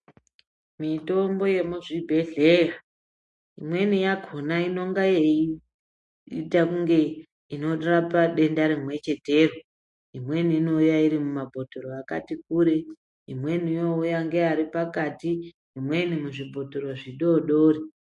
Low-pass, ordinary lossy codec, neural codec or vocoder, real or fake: 10.8 kHz; MP3, 48 kbps; none; real